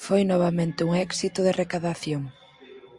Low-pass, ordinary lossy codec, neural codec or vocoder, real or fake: 10.8 kHz; Opus, 64 kbps; none; real